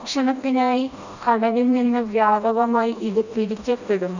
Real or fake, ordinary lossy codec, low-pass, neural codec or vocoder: fake; none; 7.2 kHz; codec, 16 kHz, 1 kbps, FreqCodec, smaller model